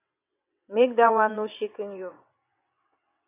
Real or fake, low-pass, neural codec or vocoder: fake; 3.6 kHz; vocoder, 22.05 kHz, 80 mel bands, WaveNeXt